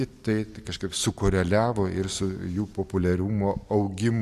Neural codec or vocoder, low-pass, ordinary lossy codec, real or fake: none; 14.4 kHz; AAC, 96 kbps; real